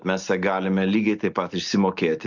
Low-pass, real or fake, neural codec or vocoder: 7.2 kHz; real; none